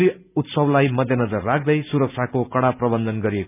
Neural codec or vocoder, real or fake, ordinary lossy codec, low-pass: none; real; none; 3.6 kHz